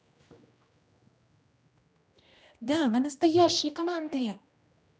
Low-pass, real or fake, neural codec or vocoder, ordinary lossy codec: none; fake; codec, 16 kHz, 1 kbps, X-Codec, HuBERT features, trained on general audio; none